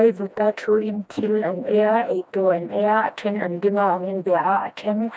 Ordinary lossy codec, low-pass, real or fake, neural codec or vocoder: none; none; fake; codec, 16 kHz, 1 kbps, FreqCodec, smaller model